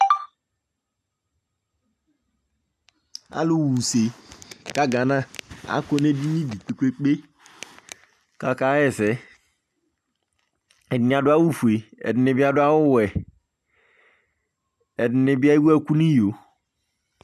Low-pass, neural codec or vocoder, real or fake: 14.4 kHz; none; real